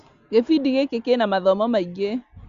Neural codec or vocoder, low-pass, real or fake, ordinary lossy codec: none; 7.2 kHz; real; Opus, 64 kbps